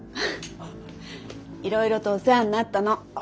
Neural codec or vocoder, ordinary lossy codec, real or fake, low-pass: none; none; real; none